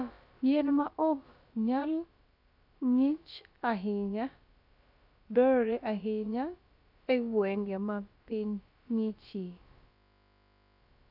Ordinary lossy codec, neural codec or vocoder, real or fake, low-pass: none; codec, 16 kHz, about 1 kbps, DyCAST, with the encoder's durations; fake; 5.4 kHz